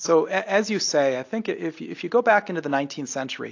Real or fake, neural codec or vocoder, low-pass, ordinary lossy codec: real; none; 7.2 kHz; AAC, 48 kbps